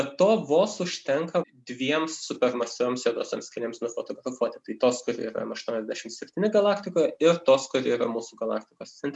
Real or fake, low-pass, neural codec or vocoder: real; 10.8 kHz; none